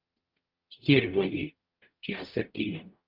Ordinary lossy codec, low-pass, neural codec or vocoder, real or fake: Opus, 24 kbps; 5.4 kHz; codec, 44.1 kHz, 0.9 kbps, DAC; fake